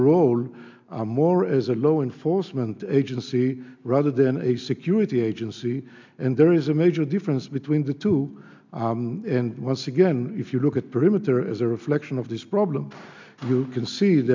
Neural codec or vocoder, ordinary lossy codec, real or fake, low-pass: none; MP3, 64 kbps; real; 7.2 kHz